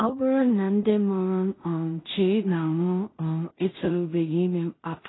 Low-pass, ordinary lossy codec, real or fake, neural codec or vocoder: 7.2 kHz; AAC, 16 kbps; fake; codec, 16 kHz in and 24 kHz out, 0.4 kbps, LongCat-Audio-Codec, two codebook decoder